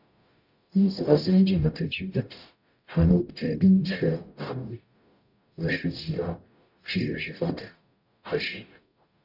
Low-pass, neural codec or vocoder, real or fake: 5.4 kHz; codec, 44.1 kHz, 0.9 kbps, DAC; fake